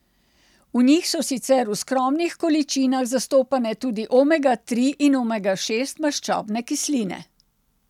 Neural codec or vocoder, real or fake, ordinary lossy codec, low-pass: none; real; none; 19.8 kHz